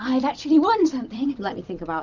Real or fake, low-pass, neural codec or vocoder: fake; 7.2 kHz; vocoder, 44.1 kHz, 128 mel bands every 256 samples, BigVGAN v2